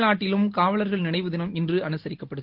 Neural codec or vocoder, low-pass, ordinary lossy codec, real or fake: none; 5.4 kHz; Opus, 16 kbps; real